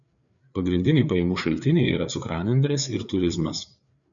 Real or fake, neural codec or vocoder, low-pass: fake; codec, 16 kHz, 4 kbps, FreqCodec, larger model; 7.2 kHz